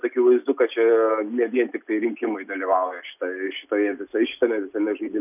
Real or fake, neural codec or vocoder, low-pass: real; none; 3.6 kHz